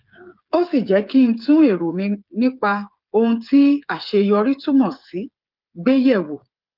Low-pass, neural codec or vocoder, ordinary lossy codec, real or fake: 5.4 kHz; codec, 16 kHz, 8 kbps, FreqCodec, smaller model; Opus, 24 kbps; fake